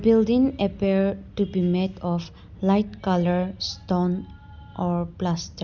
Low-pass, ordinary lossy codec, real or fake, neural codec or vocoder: 7.2 kHz; none; real; none